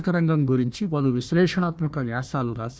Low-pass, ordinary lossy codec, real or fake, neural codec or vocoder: none; none; fake; codec, 16 kHz, 1 kbps, FunCodec, trained on Chinese and English, 50 frames a second